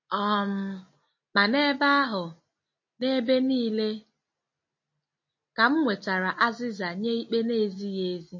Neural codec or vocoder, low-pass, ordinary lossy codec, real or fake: none; 7.2 kHz; MP3, 32 kbps; real